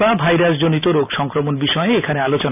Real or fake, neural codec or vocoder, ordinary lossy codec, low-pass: real; none; none; 3.6 kHz